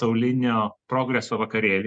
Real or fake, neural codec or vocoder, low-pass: real; none; 9.9 kHz